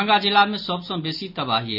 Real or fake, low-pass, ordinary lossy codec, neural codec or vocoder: real; 5.4 kHz; none; none